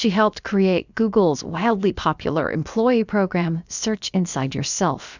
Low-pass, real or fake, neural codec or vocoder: 7.2 kHz; fake; codec, 16 kHz, about 1 kbps, DyCAST, with the encoder's durations